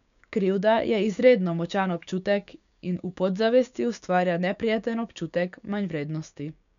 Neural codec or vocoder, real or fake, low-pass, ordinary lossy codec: codec, 16 kHz, 6 kbps, DAC; fake; 7.2 kHz; none